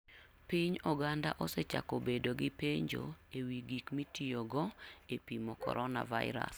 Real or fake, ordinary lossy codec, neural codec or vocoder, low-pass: real; none; none; none